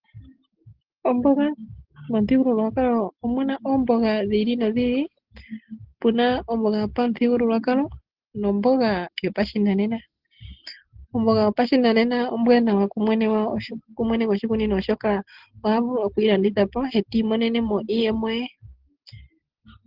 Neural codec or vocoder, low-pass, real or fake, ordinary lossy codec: none; 5.4 kHz; real; Opus, 16 kbps